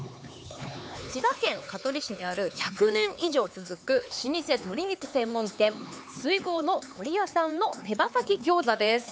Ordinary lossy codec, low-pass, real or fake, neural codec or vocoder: none; none; fake; codec, 16 kHz, 4 kbps, X-Codec, HuBERT features, trained on LibriSpeech